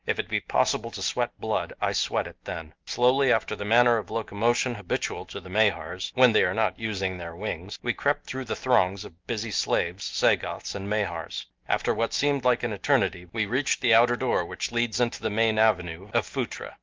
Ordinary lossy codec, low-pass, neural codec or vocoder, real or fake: Opus, 32 kbps; 7.2 kHz; none; real